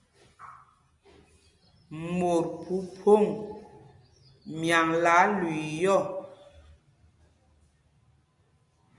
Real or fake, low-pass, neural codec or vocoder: real; 10.8 kHz; none